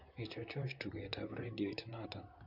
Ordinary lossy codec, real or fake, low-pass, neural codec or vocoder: none; fake; 5.4 kHz; vocoder, 44.1 kHz, 128 mel bands, Pupu-Vocoder